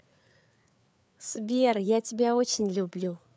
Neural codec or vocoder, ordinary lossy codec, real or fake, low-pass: codec, 16 kHz, 4 kbps, FreqCodec, larger model; none; fake; none